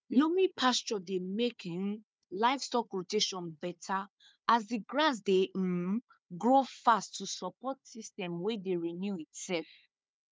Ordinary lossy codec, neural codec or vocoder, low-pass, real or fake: none; codec, 16 kHz, 4 kbps, FunCodec, trained on Chinese and English, 50 frames a second; none; fake